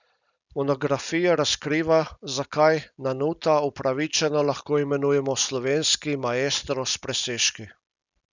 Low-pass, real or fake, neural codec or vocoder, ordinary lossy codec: 7.2 kHz; real; none; none